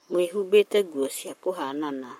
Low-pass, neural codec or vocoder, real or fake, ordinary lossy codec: 19.8 kHz; codec, 44.1 kHz, 7.8 kbps, Pupu-Codec; fake; MP3, 64 kbps